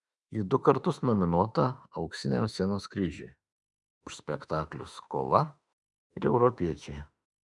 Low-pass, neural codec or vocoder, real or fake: 10.8 kHz; autoencoder, 48 kHz, 32 numbers a frame, DAC-VAE, trained on Japanese speech; fake